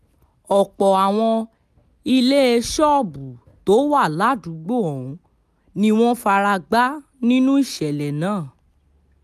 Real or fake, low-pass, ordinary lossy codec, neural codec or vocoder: real; 14.4 kHz; none; none